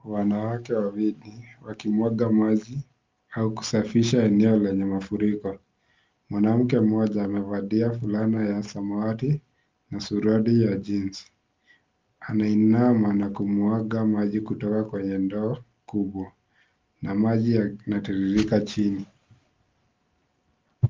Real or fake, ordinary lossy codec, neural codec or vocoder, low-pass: real; Opus, 32 kbps; none; 7.2 kHz